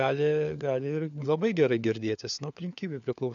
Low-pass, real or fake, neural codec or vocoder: 7.2 kHz; fake; codec, 16 kHz, 4 kbps, FunCodec, trained on LibriTTS, 50 frames a second